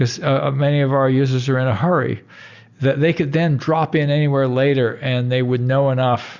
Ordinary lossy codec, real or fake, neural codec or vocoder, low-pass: Opus, 64 kbps; real; none; 7.2 kHz